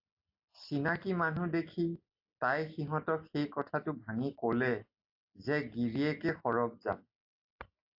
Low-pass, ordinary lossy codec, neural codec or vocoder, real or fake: 5.4 kHz; AAC, 48 kbps; none; real